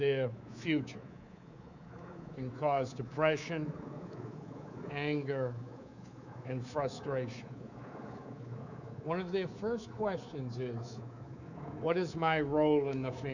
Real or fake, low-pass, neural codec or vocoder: fake; 7.2 kHz; codec, 24 kHz, 3.1 kbps, DualCodec